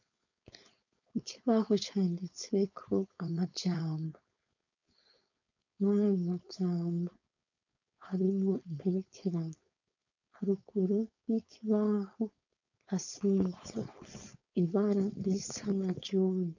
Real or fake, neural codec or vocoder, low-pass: fake; codec, 16 kHz, 4.8 kbps, FACodec; 7.2 kHz